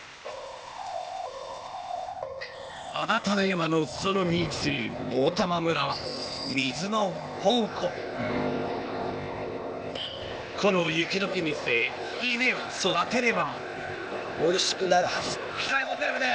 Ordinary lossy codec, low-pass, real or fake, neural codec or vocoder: none; none; fake; codec, 16 kHz, 0.8 kbps, ZipCodec